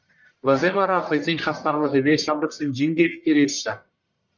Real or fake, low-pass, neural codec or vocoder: fake; 7.2 kHz; codec, 44.1 kHz, 1.7 kbps, Pupu-Codec